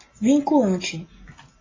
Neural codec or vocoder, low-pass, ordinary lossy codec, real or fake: none; 7.2 kHz; AAC, 32 kbps; real